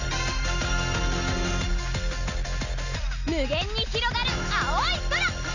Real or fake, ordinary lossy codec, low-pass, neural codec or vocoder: real; none; 7.2 kHz; none